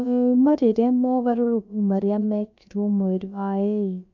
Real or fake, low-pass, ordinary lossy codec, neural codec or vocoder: fake; 7.2 kHz; none; codec, 16 kHz, about 1 kbps, DyCAST, with the encoder's durations